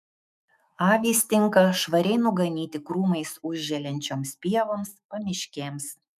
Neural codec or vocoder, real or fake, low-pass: autoencoder, 48 kHz, 128 numbers a frame, DAC-VAE, trained on Japanese speech; fake; 14.4 kHz